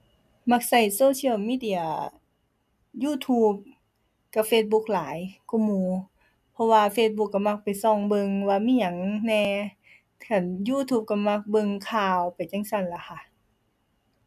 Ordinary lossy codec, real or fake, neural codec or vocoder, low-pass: MP3, 96 kbps; real; none; 14.4 kHz